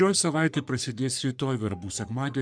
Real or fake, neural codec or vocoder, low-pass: fake; codec, 44.1 kHz, 3.4 kbps, Pupu-Codec; 9.9 kHz